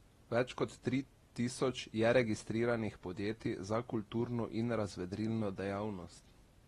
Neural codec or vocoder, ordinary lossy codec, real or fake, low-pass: none; AAC, 32 kbps; real; 19.8 kHz